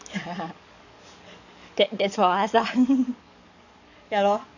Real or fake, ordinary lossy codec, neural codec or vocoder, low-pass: fake; none; codec, 44.1 kHz, 7.8 kbps, DAC; 7.2 kHz